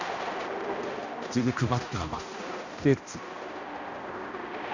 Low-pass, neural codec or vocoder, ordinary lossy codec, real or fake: 7.2 kHz; codec, 16 kHz, 1 kbps, X-Codec, HuBERT features, trained on balanced general audio; Opus, 64 kbps; fake